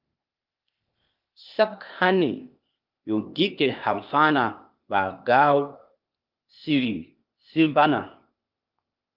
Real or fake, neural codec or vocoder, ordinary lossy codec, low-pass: fake; codec, 16 kHz, 0.8 kbps, ZipCodec; Opus, 24 kbps; 5.4 kHz